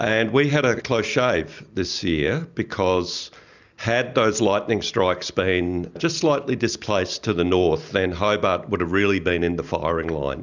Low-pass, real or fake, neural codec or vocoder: 7.2 kHz; real; none